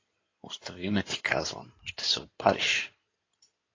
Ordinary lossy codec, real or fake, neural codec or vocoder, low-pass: AAC, 32 kbps; fake; codec, 16 kHz in and 24 kHz out, 2.2 kbps, FireRedTTS-2 codec; 7.2 kHz